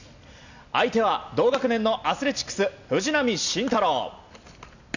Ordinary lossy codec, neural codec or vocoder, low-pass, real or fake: none; none; 7.2 kHz; real